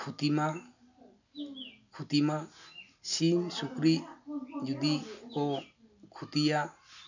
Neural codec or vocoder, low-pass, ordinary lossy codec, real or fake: none; 7.2 kHz; none; real